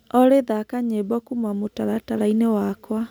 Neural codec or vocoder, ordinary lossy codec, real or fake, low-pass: none; none; real; none